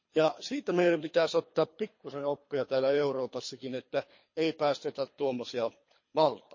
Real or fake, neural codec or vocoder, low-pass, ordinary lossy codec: fake; codec, 24 kHz, 3 kbps, HILCodec; 7.2 kHz; MP3, 32 kbps